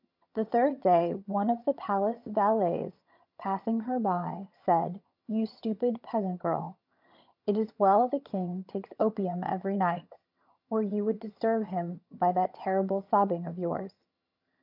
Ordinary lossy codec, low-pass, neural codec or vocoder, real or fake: MP3, 48 kbps; 5.4 kHz; vocoder, 22.05 kHz, 80 mel bands, HiFi-GAN; fake